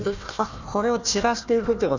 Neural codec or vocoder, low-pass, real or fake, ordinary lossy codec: codec, 16 kHz, 1 kbps, FunCodec, trained on Chinese and English, 50 frames a second; 7.2 kHz; fake; none